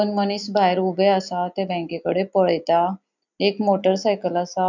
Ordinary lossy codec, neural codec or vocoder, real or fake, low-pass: none; none; real; 7.2 kHz